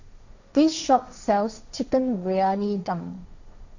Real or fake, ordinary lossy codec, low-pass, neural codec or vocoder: fake; none; 7.2 kHz; codec, 16 kHz, 1.1 kbps, Voila-Tokenizer